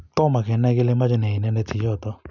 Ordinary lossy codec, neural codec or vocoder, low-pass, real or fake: none; none; 7.2 kHz; real